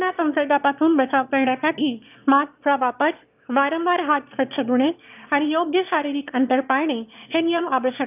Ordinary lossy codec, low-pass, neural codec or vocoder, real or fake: none; 3.6 kHz; autoencoder, 22.05 kHz, a latent of 192 numbers a frame, VITS, trained on one speaker; fake